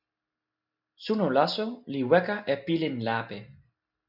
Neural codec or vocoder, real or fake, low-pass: none; real; 5.4 kHz